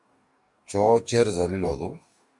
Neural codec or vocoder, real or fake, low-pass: codec, 44.1 kHz, 2.6 kbps, DAC; fake; 10.8 kHz